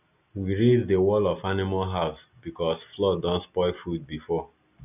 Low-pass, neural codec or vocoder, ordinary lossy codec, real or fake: 3.6 kHz; none; none; real